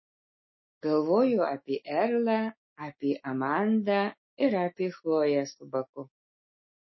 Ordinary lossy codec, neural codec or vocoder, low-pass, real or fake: MP3, 24 kbps; autoencoder, 48 kHz, 128 numbers a frame, DAC-VAE, trained on Japanese speech; 7.2 kHz; fake